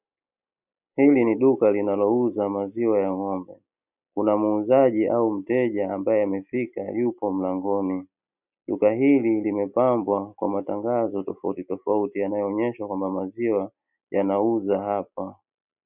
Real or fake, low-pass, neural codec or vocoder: real; 3.6 kHz; none